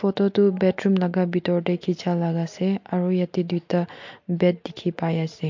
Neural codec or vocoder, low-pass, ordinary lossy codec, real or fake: none; 7.2 kHz; MP3, 48 kbps; real